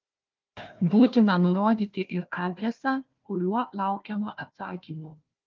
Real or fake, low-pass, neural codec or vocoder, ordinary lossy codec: fake; 7.2 kHz; codec, 16 kHz, 1 kbps, FunCodec, trained on Chinese and English, 50 frames a second; Opus, 32 kbps